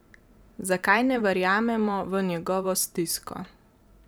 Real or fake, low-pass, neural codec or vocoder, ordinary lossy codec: fake; none; vocoder, 44.1 kHz, 128 mel bands every 512 samples, BigVGAN v2; none